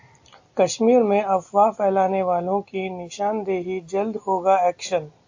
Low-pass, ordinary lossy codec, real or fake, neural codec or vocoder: 7.2 kHz; AAC, 48 kbps; real; none